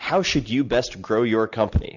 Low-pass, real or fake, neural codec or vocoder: 7.2 kHz; real; none